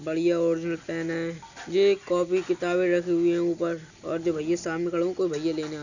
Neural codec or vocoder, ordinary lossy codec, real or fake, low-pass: none; none; real; 7.2 kHz